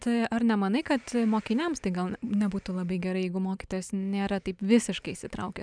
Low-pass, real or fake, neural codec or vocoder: 9.9 kHz; fake; vocoder, 44.1 kHz, 128 mel bands every 512 samples, BigVGAN v2